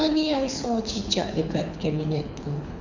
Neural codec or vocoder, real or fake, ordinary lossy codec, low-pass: codec, 24 kHz, 6 kbps, HILCodec; fake; none; 7.2 kHz